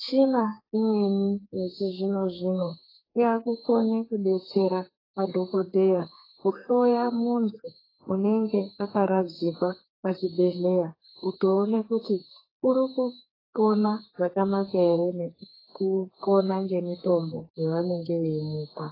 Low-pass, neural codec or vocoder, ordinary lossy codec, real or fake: 5.4 kHz; codec, 32 kHz, 1.9 kbps, SNAC; AAC, 24 kbps; fake